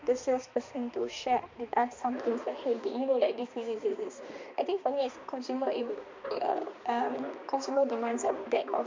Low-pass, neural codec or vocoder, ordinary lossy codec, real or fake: 7.2 kHz; codec, 16 kHz, 2 kbps, X-Codec, HuBERT features, trained on balanced general audio; MP3, 48 kbps; fake